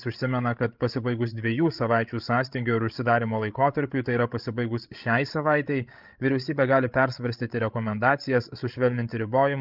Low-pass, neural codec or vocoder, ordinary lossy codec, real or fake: 5.4 kHz; codec, 16 kHz, 16 kbps, FreqCodec, larger model; Opus, 32 kbps; fake